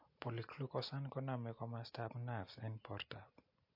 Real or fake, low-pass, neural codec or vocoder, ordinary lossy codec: real; 5.4 kHz; none; MP3, 32 kbps